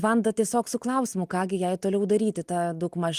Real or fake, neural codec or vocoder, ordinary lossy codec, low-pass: real; none; Opus, 24 kbps; 14.4 kHz